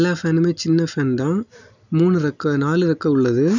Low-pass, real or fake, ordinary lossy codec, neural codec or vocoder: 7.2 kHz; real; none; none